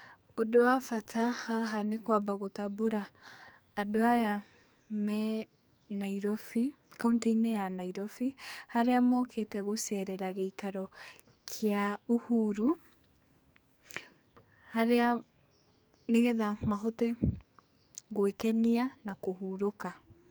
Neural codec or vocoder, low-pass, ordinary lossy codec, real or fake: codec, 44.1 kHz, 2.6 kbps, SNAC; none; none; fake